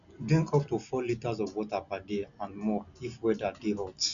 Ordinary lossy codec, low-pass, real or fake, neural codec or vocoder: none; 7.2 kHz; real; none